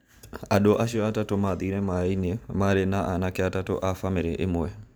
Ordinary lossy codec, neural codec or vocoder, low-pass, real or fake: none; vocoder, 44.1 kHz, 128 mel bands every 512 samples, BigVGAN v2; none; fake